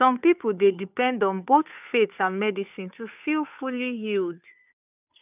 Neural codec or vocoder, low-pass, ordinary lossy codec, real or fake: codec, 16 kHz, 2 kbps, FunCodec, trained on Chinese and English, 25 frames a second; 3.6 kHz; none; fake